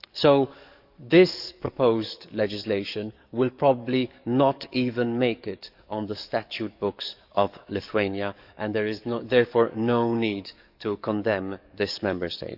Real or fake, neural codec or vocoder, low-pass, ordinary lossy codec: fake; codec, 44.1 kHz, 7.8 kbps, DAC; 5.4 kHz; none